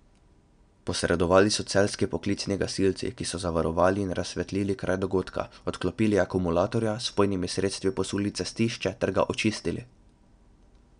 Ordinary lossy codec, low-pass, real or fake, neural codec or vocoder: none; 9.9 kHz; real; none